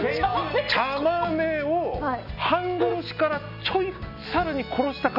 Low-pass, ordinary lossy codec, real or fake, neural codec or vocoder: 5.4 kHz; none; real; none